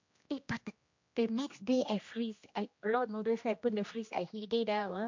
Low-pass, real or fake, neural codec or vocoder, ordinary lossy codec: 7.2 kHz; fake; codec, 16 kHz, 1 kbps, X-Codec, HuBERT features, trained on general audio; MP3, 48 kbps